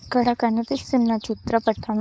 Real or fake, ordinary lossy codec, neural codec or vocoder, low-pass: fake; none; codec, 16 kHz, 4.8 kbps, FACodec; none